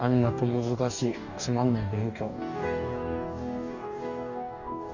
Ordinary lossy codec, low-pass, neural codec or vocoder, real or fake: none; 7.2 kHz; codec, 44.1 kHz, 2.6 kbps, DAC; fake